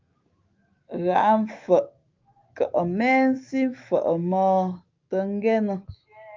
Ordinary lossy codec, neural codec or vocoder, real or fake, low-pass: Opus, 24 kbps; autoencoder, 48 kHz, 128 numbers a frame, DAC-VAE, trained on Japanese speech; fake; 7.2 kHz